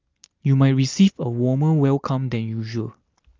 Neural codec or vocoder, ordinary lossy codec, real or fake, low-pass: none; Opus, 24 kbps; real; 7.2 kHz